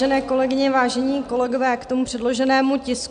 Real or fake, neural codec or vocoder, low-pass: real; none; 9.9 kHz